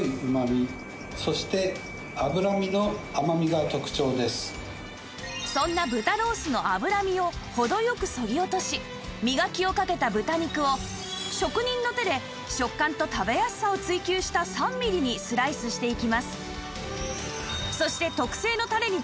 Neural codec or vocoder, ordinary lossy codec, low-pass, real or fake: none; none; none; real